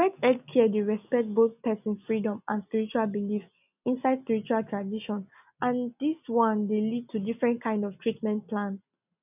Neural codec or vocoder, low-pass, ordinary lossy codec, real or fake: none; 3.6 kHz; none; real